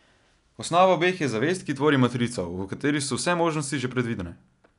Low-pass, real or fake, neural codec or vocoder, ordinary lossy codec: 10.8 kHz; real; none; none